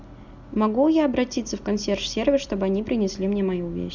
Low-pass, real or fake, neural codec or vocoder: 7.2 kHz; real; none